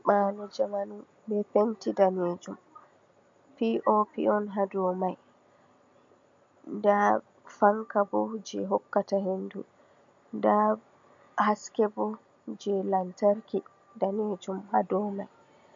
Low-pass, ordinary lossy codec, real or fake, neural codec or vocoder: 7.2 kHz; MP3, 48 kbps; real; none